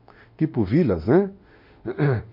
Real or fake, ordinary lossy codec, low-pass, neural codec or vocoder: fake; MP3, 32 kbps; 5.4 kHz; autoencoder, 48 kHz, 32 numbers a frame, DAC-VAE, trained on Japanese speech